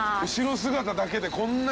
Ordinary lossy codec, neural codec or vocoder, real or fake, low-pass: none; none; real; none